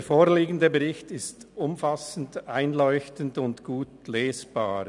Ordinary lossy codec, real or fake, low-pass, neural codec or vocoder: none; real; 10.8 kHz; none